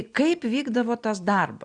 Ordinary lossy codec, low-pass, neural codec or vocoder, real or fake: Opus, 64 kbps; 9.9 kHz; none; real